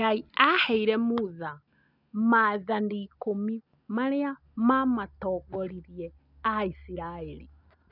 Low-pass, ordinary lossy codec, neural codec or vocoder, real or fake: 5.4 kHz; none; none; real